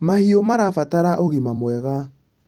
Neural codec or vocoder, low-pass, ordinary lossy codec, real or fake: vocoder, 44.1 kHz, 128 mel bands every 256 samples, BigVGAN v2; 19.8 kHz; Opus, 24 kbps; fake